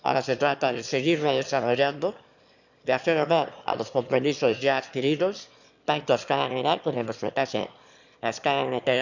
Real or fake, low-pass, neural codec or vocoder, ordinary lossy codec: fake; 7.2 kHz; autoencoder, 22.05 kHz, a latent of 192 numbers a frame, VITS, trained on one speaker; none